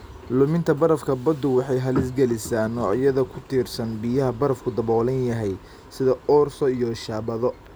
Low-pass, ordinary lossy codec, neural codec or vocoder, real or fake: none; none; none; real